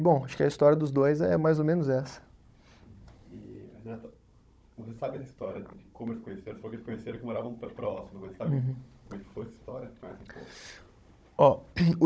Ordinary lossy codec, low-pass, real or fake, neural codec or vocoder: none; none; fake; codec, 16 kHz, 16 kbps, FunCodec, trained on Chinese and English, 50 frames a second